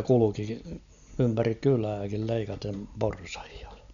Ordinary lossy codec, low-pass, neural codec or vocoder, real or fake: none; 7.2 kHz; none; real